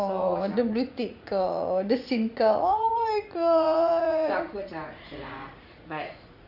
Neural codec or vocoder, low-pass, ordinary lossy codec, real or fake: vocoder, 44.1 kHz, 80 mel bands, Vocos; 5.4 kHz; Opus, 64 kbps; fake